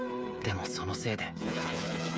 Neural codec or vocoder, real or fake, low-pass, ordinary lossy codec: codec, 16 kHz, 8 kbps, FreqCodec, smaller model; fake; none; none